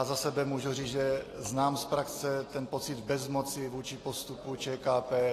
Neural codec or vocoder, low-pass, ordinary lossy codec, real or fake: none; 14.4 kHz; AAC, 48 kbps; real